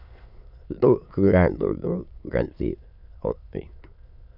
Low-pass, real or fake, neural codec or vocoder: 5.4 kHz; fake; autoencoder, 22.05 kHz, a latent of 192 numbers a frame, VITS, trained on many speakers